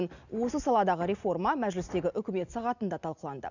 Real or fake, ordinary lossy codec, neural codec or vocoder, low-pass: real; none; none; 7.2 kHz